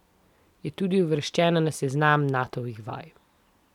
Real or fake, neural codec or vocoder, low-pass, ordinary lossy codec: real; none; 19.8 kHz; none